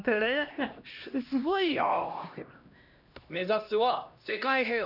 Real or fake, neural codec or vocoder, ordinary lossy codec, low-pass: fake; codec, 16 kHz, 1 kbps, X-Codec, HuBERT features, trained on LibriSpeech; none; 5.4 kHz